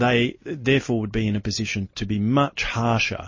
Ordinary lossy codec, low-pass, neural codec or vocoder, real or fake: MP3, 32 kbps; 7.2 kHz; none; real